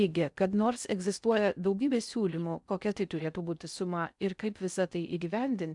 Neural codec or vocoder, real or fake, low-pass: codec, 16 kHz in and 24 kHz out, 0.6 kbps, FocalCodec, streaming, 4096 codes; fake; 10.8 kHz